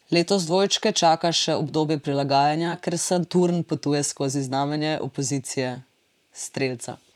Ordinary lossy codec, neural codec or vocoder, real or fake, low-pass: none; vocoder, 44.1 kHz, 128 mel bands, Pupu-Vocoder; fake; 19.8 kHz